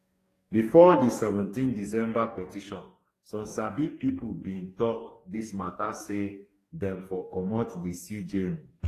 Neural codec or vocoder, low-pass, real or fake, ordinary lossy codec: codec, 44.1 kHz, 2.6 kbps, DAC; 14.4 kHz; fake; AAC, 48 kbps